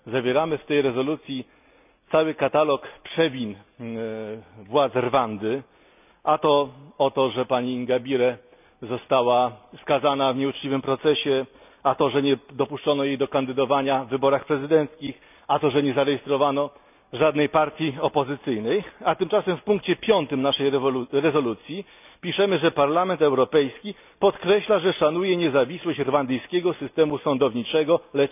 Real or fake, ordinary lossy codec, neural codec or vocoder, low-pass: real; none; none; 3.6 kHz